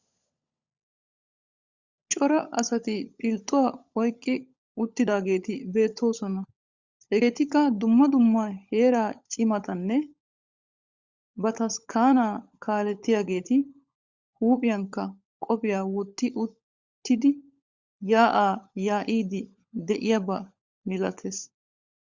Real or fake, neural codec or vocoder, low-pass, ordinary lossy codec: fake; codec, 16 kHz, 16 kbps, FunCodec, trained on LibriTTS, 50 frames a second; 7.2 kHz; Opus, 64 kbps